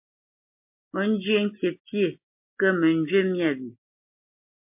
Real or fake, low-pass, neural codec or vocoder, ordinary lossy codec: real; 3.6 kHz; none; MP3, 32 kbps